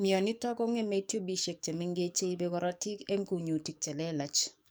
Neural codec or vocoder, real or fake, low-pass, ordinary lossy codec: codec, 44.1 kHz, 7.8 kbps, DAC; fake; none; none